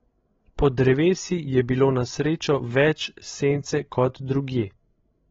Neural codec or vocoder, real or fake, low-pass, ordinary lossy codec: codec, 16 kHz, 16 kbps, FreqCodec, larger model; fake; 7.2 kHz; AAC, 24 kbps